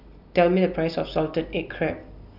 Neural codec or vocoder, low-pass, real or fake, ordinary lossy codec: none; 5.4 kHz; real; none